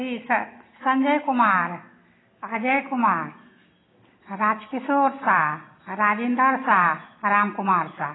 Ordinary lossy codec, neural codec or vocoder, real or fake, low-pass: AAC, 16 kbps; none; real; 7.2 kHz